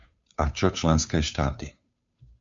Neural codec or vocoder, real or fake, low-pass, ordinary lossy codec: codec, 16 kHz, 2 kbps, FunCodec, trained on Chinese and English, 25 frames a second; fake; 7.2 kHz; MP3, 48 kbps